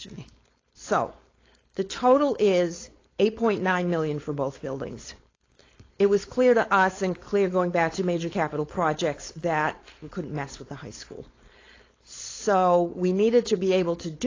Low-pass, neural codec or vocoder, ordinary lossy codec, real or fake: 7.2 kHz; codec, 16 kHz, 4.8 kbps, FACodec; AAC, 32 kbps; fake